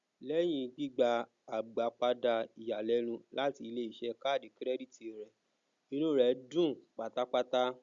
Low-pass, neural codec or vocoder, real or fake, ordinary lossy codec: 7.2 kHz; none; real; Opus, 64 kbps